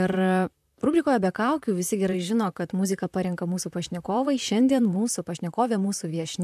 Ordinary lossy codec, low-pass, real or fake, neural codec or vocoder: AAC, 96 kbps; 14.4 kHz; fake; vocoder, 44.1 kHz, 128 mel bands, Pupu-Vocoder